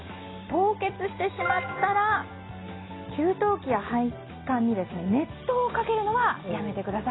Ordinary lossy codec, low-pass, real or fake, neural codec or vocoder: AAC, 16 kbps; 7.2 kHz; real; none